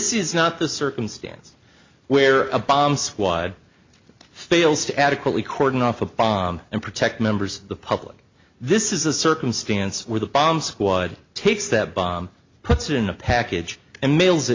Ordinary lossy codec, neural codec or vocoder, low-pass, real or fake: MP3, 48 kbps; none; 7.2 kHz; real